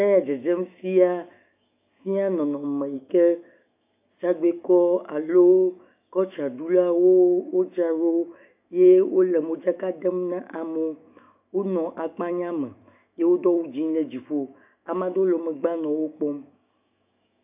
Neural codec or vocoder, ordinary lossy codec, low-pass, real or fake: autoencoder, 48 kHz, 128 numbers a frame, DAC-VAE, trained on Japanese speech; AAC, 32 kbps; 3.6 kHz; fake